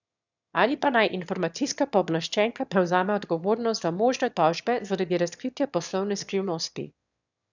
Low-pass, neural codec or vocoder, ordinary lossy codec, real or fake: 7.2 kHz; autoencoder, 22.05 kHz, a latent of 192 numbers a frame, VITS, trained on one speaker; none; fake